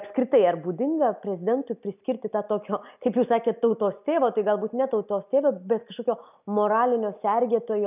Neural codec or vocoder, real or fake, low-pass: none; real; 3.6 kHz